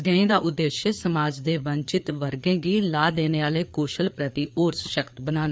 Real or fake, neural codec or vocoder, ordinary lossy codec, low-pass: fake; codec, 16 kHz, 4 kbps, FreqCodec, larger model; none; none